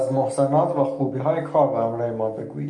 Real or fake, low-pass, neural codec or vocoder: real; 10.8 kHz; none